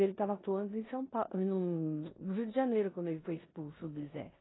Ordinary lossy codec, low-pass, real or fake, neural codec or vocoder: AAC, 16 kbps; 7.2 kHz; fake; codec, 16 kHz in and 24 kHz out, 0.9 kbps, LongCat-Audio-Codec, four codebook decoder